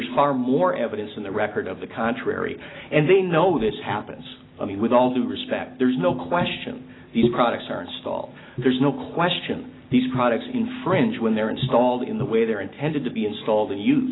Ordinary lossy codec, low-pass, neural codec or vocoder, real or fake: AAC, 16 kbps; 7.2 kHz; none; real